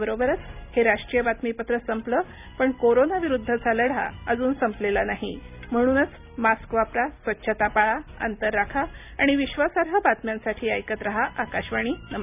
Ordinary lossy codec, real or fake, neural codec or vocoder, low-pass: none; real; none; 3.6 kHz